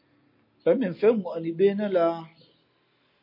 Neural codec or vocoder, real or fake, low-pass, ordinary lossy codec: none; real; 5.4 kHz; MP3, 32 kbps